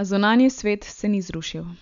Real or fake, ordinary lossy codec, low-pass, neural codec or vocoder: real; none; 7.2 kHz; none